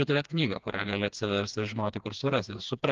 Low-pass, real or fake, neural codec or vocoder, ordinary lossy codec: 7.2 kHz; fake; codec, 16 kHz, 2 kbps, FreqCodec, smaller model; Opus, 32 kbps